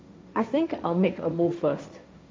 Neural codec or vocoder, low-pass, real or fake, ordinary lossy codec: codec, 16 kHz, 1.1 kbps, Voila-Tokenizer; none; fake; none